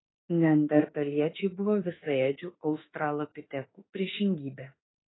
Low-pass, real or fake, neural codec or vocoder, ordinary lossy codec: 7.2 kHz; fake; autoencoder, 48 kHz, 32 numbers a frame, DAC-VAE, trained on Japanese speech; AAC, 16 kbps